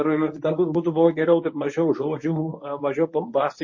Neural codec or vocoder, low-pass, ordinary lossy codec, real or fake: codec, 24 kHz, 0.9 kbps, WavTokenizer, medium speech release version 1; 7.2 kHz; MP3, 32 kbps; fake